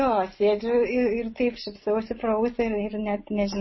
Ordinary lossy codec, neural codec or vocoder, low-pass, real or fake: MP3, 24 kbps; none; 7.2 kHz; real